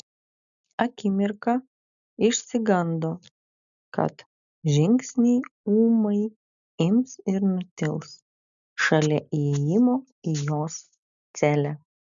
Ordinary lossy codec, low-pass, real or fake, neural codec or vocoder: MP3, 96 kbps; 7.2 kHz; real; none